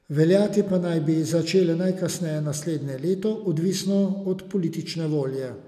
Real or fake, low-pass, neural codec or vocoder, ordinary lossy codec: real; 14.4 kHz; none; none